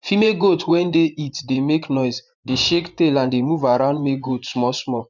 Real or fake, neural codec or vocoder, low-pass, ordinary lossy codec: fake; vocoder, 24 kHz, 100 mel bands, Vocos; 7.2 kHz; none